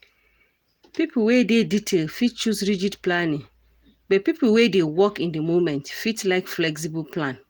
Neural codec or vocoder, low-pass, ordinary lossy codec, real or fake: none; 19.8 kHz; Opus, 24 kbps; real